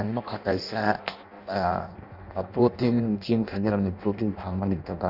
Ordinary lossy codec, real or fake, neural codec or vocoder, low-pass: none; fake; codec, 16 kHz in and 24 kHz out, 0.6 kbps, FireRedTTS-2 codec; 5.4 kHz